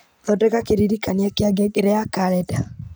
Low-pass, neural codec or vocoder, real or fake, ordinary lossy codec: none; none; real; none